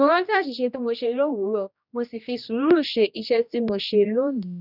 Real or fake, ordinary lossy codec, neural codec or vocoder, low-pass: fake; none; codec, 16 kHz, 1 kbps, X-Codec, HuBERT features, trained on general audio; 5.4 kHz